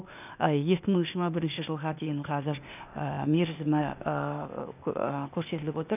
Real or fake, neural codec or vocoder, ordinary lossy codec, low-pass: fake; codec, 16 kHz, 0.8 kbps, ZipCodec; none; 3.6 kHz